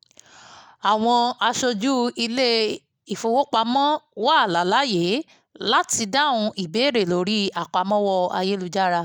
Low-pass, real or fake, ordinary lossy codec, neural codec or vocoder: 19.8 kHz; real; none; none